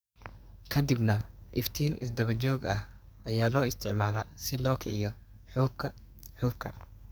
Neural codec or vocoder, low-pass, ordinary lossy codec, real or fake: codec, 44.1 kHz, 2.6 kbps, SNAC; none; none; fake